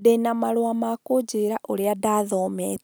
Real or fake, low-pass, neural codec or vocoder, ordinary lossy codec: real; none; none; none